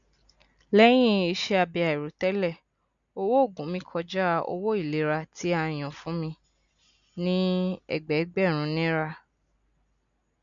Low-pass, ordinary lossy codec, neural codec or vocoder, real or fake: 7.2 kHz; none; none; real